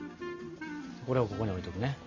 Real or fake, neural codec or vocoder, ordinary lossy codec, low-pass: real; none; MP3, 64 kbps; 7.2 kHz